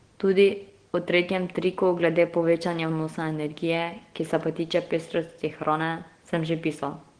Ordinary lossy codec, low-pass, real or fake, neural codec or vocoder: Opus, 16 kbps; 9.9 kHz; real; none